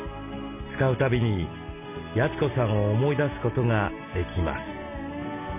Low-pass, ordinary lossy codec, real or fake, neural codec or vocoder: 3.6 kHz; none; real; none